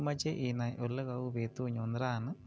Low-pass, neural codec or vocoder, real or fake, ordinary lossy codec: none; none; real; none